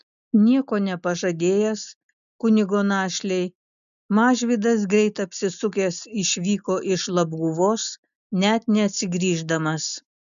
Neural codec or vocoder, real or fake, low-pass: none; real; 7.2 kHz